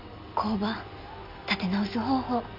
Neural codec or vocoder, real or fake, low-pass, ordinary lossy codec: none; real; 5.4 kHz; none